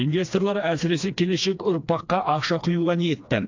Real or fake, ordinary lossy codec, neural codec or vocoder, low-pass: fake; AAC, 48 kbps; codec, 16 kHz, 2 kbps, FreqCodec, smaller model; 7.2 kHz